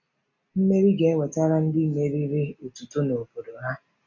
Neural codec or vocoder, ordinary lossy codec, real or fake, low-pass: none; none; real; 7.2 kHz